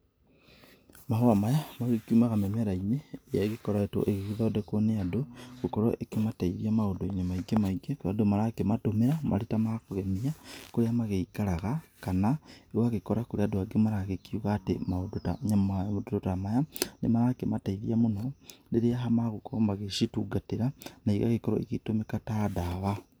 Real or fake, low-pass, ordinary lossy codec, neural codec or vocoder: real; none; none; none